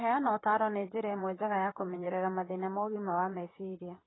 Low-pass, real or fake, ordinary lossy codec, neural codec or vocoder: 7.2 kHz; fake; AAC, 16 kbps; vocoder, 44.1 kHz, 128 mel bands, Pupu-Vocoder